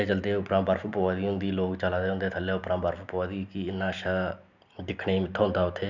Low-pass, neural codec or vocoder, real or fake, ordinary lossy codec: 7.2 kHz; none; real; none